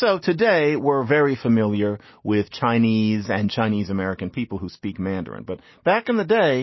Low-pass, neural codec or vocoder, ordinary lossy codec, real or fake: 7.2 kHz; none; MP3, 24 kbps; real